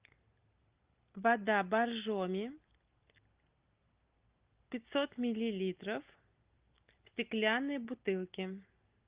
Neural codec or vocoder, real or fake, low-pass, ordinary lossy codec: none; real; 3.6 kHz; Opus, 32 kbps